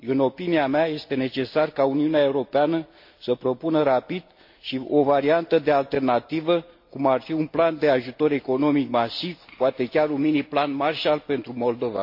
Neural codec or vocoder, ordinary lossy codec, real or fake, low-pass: none; MP3, 32 kbps; real; 5.4 kHz